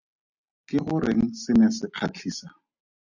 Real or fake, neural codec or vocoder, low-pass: real; none; 7.2 kHz